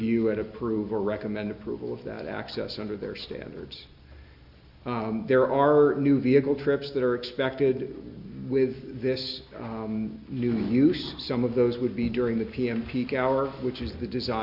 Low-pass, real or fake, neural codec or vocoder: 5.4 kHz; real; none